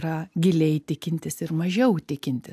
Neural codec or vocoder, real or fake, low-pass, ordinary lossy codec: none; real; 14.4 kHz; AAC, 96 kbps